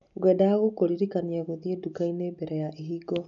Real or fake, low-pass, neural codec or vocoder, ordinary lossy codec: real; 7.2 kHz; none; none